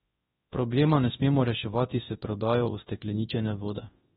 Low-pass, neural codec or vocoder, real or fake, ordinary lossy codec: 10.8 kHz; codec, 24 kHz, 0.9 kbps, WavTokenizer, large speech release; fake; AAC, 16 kbps